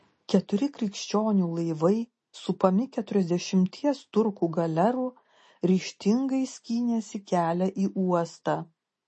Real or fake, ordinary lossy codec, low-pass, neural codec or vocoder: real; MP3, 32 kbps; 10.8 kHz; none